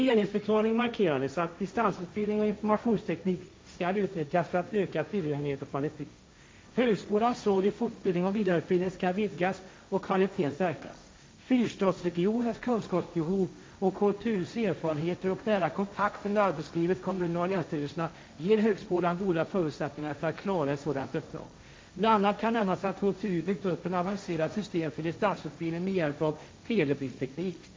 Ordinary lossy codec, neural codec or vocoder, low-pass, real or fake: none; codec, 16 kHz, 1.1 kbps, Voila-Tokenizer; none; fake